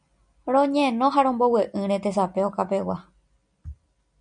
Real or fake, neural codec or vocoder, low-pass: real; none; 9.9 kHz